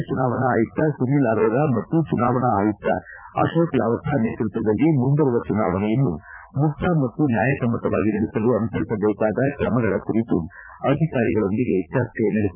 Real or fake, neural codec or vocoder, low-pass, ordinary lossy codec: fake; vocoder, 44.1 kHz, 80 mel bands, Vocos; 3.6 kHz; none